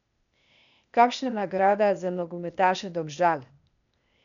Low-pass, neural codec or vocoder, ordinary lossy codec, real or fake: 7.2 kHz; codec, 16 kHz, 0.8 kbps, ZipCodec; none; fake